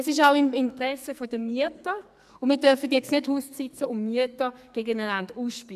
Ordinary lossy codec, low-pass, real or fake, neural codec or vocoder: none; 14.4 kHz; fake; codec, 44.1 kHz, 2.6 kbps, SNAC